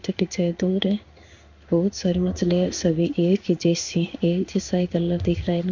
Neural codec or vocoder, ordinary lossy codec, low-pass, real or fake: codec, 16 kHz in and 24 kHz out, 1 kbps, XY-Tokenizer; none; 7.2 kHz; fake